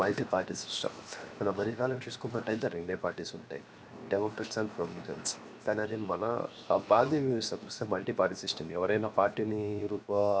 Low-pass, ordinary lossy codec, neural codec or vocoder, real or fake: none; none; codec, 16 kHz, 0.7 kbps, FocalCodec; fake